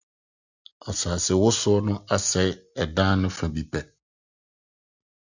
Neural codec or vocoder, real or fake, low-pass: none; real; 7.2 kHz